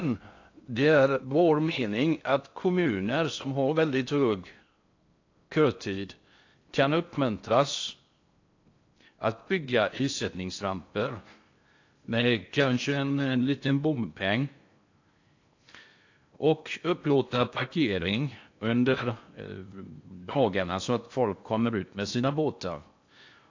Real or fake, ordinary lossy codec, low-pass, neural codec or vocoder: fake; AAC, 48 kbps; 7.2 kHz; codec, 16 kHz in and 24 kHz out, 0.6 kbps, FocalCodec, streaming, 2048 codes